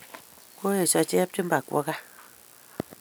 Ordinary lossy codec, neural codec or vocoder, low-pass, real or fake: none; none; none; real